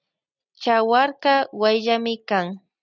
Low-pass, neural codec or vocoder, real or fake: 7.2 kHz; none; real